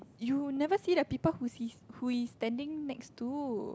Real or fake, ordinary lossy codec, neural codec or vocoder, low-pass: real; none; none; none